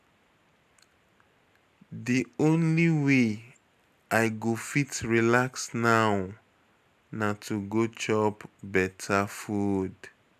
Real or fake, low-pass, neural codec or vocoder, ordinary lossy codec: real; 14.4 kHz; none; none